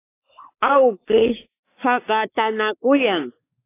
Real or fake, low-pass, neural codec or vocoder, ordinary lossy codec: fake; 3.6 kHz; codec, 16 kHz, 4 kbps, X-Codec, WavLM features, trained on Multilingual LibriSpeech; AAC, 24 kbps